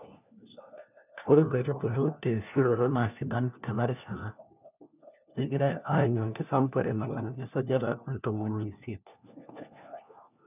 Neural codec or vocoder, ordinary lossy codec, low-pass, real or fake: codec, 16 kHz, 1 kbps, FunCodec, trained on LibriTTS, 50 frames a second; none; 3.6 kHz; fake